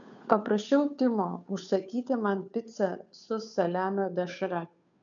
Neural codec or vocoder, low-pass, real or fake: codec, 16 kHz, 2 kbps, FunCodec, trained on Chinese and English, 25 frames a second; 7.2 kHz; fake